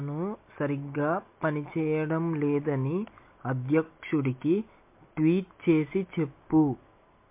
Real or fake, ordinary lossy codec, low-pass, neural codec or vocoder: real; MP3, 24 kbps; 3.6 kHz; none